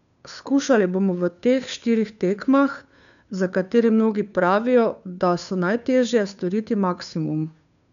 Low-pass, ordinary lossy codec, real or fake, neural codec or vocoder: 7.2 kHz; none; fake; codec, 16 kHz, 2 kbps, FunCodec, trained on Chinese and English, 25 frames a second